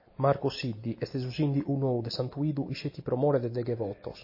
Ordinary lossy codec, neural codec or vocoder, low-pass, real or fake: MP3, 24 kbps; none; 5.4 kHz; real